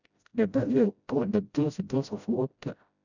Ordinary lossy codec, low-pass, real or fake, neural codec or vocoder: none; 7.2 kHz; fake; codec, 16 kHz, 0.5 kbps, FreqCodec, smaller model